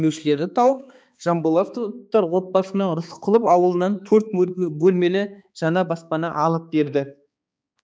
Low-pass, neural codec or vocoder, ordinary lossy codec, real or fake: none; codec, 16 kHz, 2 kbps, X-Codec, HuBERT features, trained on balanced general audio; none; fake